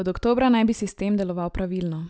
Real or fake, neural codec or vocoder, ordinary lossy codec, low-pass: real; none; none; none